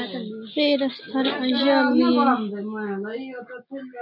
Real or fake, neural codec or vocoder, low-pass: real; none; 5.4 kHz